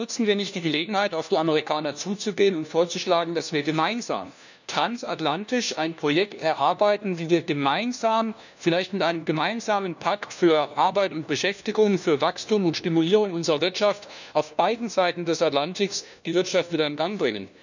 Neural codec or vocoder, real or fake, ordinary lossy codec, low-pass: codec, 16 kHz, 1 kbps, FunCodec, trained on LibriTTS, 50 frames a second; fake; none; 7.2 kHz